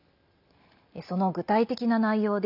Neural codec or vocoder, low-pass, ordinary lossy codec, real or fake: none; 5.4 kHz; none; real